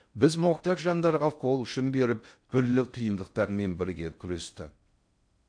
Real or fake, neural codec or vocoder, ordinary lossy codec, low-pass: fake; codec, 16 kHz in and 24 kHz out, 0.6 kbps, FocalCodec, streaming, 4096 codes; AAC, 64 kbps; 9.9 kHz